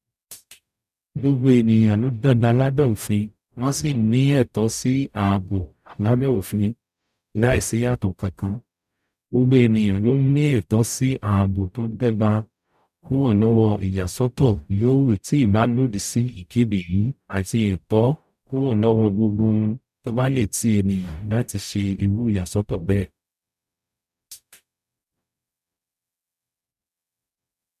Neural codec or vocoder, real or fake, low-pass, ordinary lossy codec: codec, 44.1 kHz, 0.9 kbps, DAC; fake; 14.4 kHz; none